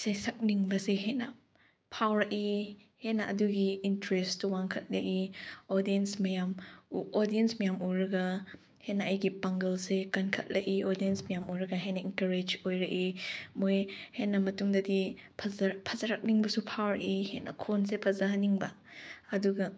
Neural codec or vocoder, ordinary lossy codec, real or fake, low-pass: codec, 16 kHz, 6 kbps, DAC; none; fake; none